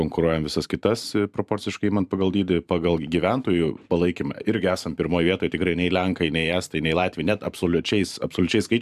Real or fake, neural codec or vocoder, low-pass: fake; vocoder, 44.1 kHz, 128 mel bands every 512 samples, BigVGAN v2; 14.4 kHz